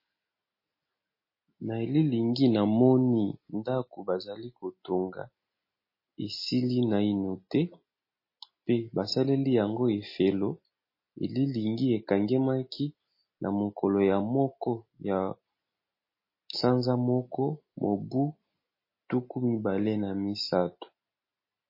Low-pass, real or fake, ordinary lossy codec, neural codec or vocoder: 5.4 kHz; real; MP3, 24 kbps; none